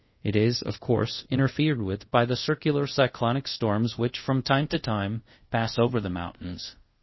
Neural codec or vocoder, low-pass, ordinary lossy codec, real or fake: codec, 24 kHz, 0.5 kbps, DualCodec; 7.2 kHz; MP3, 24 kbps; fake